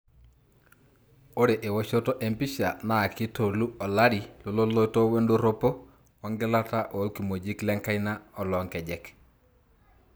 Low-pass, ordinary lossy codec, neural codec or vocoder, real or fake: none; none; none; real